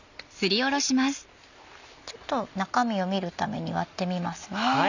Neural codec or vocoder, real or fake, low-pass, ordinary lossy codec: none; real; 7.2 kHz; none